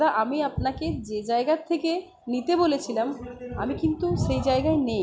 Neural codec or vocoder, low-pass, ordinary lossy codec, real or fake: none; none; none; real